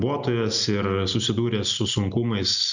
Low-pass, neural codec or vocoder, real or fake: 7.2 kHz; none; real